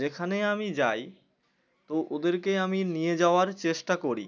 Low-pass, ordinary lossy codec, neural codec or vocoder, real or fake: 7.2 kHz; none; none; real